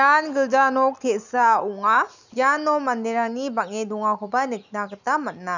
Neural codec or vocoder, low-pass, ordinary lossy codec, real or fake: none; 7.2 kHz; none; real